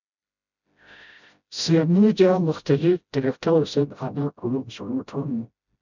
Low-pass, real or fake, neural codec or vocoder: 7.2 kHz; fake; codec, 16 kHz, 0.5 kbps, FreqCodec, smaller model